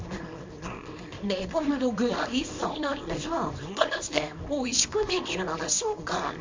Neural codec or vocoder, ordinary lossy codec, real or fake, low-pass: codec, 24 kHz, 0.9 kbps, WavTokenizer, small release; MP3, 48 kbps; fake; 7.2 kHz